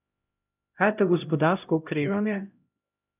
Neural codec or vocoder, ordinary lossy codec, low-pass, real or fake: codec, 16 kHz, 0.5 kbps, X-Codec, HuBERT features, trained on LibriSpeech; none; 3.6 kHz; fake